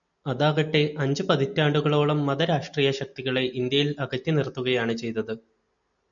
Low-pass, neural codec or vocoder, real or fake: 7.2 kHz; none; real